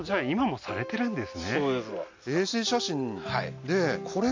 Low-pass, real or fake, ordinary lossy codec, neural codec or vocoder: 7.2 kHz; real; MP3, 64 kbps; none